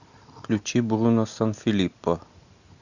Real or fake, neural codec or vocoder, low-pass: real; none; 7.2 kHz